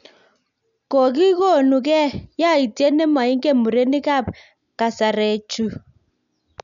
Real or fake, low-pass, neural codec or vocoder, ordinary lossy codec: real; 7.2 kHz; none; none